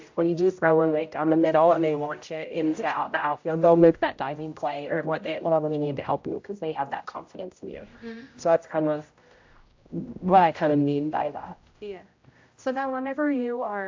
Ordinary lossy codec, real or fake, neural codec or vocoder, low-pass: AAC, 48 kbps; fake; codec, 16 kHz, 0.5 kbps, X-Codec, HuBERT features, trained on general audio; 7.2 kHz